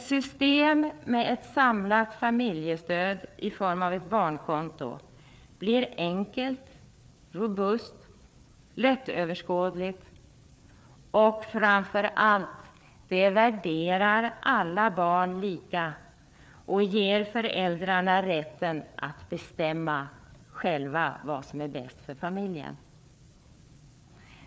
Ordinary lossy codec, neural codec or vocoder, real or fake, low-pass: none; codec, 16 kHz, 4 kbps, FreqCodec, larger model; fake; none